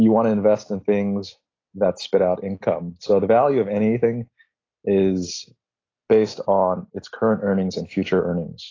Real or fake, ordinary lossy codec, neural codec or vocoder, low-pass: real; AAC, 32 kbps; none; 7.2 kHz